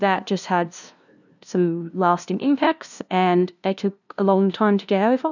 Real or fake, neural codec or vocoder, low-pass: fake; codec, 16 kHz, 0.5 kbps, FunCodec, trained on LibriTTS, 25 frames a second; 7.2 kHz